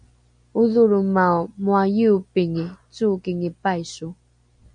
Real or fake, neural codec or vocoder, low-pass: real; none; 9.9 kHz